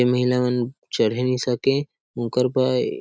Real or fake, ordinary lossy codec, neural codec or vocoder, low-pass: real; none; none; none